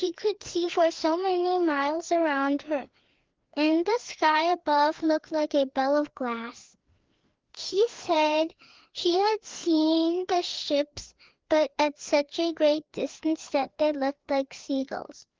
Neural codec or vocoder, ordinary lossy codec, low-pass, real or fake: codec, 16 kHz, 2 kbps, FreqCodec, larger model; Opus, 16 kbps; 7.2 kHz; fake